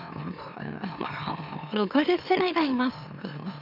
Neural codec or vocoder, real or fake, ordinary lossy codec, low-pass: autoencoder, 44.1 kHz, a latent of 192 numbers a frame, MeloTTS; fake; none; 5.4 kHz